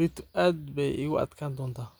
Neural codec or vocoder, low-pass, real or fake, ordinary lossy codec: none; none; real; none